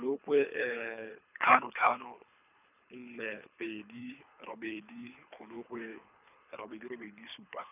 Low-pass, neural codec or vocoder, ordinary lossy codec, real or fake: 3.6 kHz; codec, 24 kHz, 3 kbps, HILCodec; none; fake